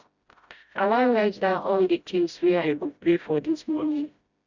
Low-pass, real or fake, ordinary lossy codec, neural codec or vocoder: 7.2 kHz; fake; Opus, 64 kbps; codec, 16 kHz, 0.5 kbps, FreqCodec, smaller model